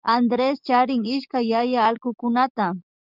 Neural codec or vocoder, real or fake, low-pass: codec, 44.1 kHz, 7.8 kbps, DAC; fake; 5.4 kHz